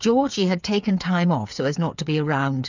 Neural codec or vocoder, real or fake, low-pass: codec, 16 kHz, 8 kbps, FreqCodec, smaller model; fake; 7.2 kHz